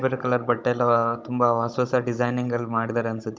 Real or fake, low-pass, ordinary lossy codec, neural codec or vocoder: fake; none; none; codec, 16 kHz, 16 kbps, FunCodec, trained on Chinese and English, 50 frames a second